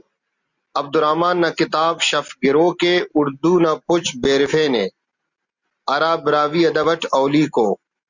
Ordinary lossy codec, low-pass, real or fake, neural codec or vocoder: Opus, 64 kbps; 7.2 kHz; real; none